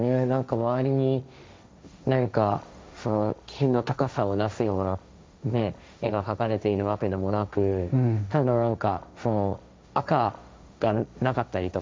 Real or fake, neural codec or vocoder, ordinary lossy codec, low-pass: fake; codec, 16 kHz, 1.1 kbps, Voila-Tokenizer; none; none